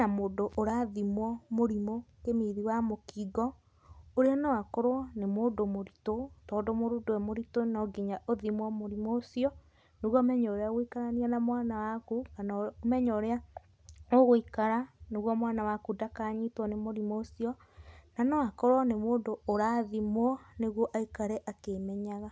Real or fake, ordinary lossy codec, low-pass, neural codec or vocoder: real; none; none; none